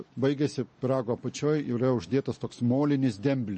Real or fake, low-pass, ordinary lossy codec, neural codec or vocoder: real; 10.8 kHz; MP3, 32 kbps; none